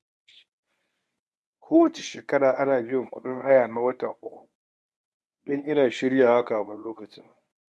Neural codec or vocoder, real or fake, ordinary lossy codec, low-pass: codec, 24 kHz, 0.9 kbps, WavTokenizer, medium speech release version 1; fake; none; none